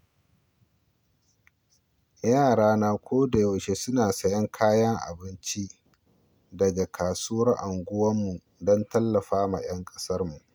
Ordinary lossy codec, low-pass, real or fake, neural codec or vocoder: none; none; real; none